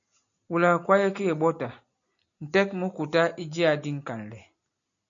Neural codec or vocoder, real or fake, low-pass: none; real; 7.2 kHz